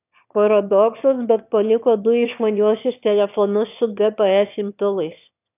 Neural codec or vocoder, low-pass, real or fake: autoencoder, 22.05 kHz, a latent of 192 numbers a frame, VITS, trained on one speaker; 3.6 kHz; fake